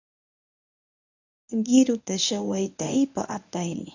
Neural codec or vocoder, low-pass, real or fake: codec, 24 kHz, 0.9 kbps, WavTokenizer, medium speech release version 1; 7.2 kHz; fake